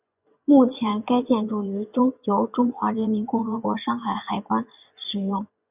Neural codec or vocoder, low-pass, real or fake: vocoder, 24 kHz, 100 mel bands, Vocos; 3.6 kHz; fake